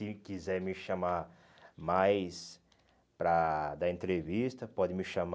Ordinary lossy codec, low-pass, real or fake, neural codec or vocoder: none; none; real; none